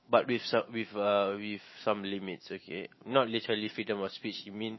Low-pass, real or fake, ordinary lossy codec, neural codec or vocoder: 7.2 kHz; fake; MP3, 24 kbps; autoencoder, 48 kHz, 128 numbers a frame, DAC-VAE, trained on Japanese speech